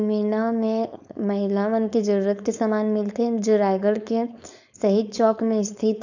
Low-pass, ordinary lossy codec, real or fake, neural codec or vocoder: 7.2 kHz; none; fake; codec, 16 kHz, 4.8 kbps, FACodec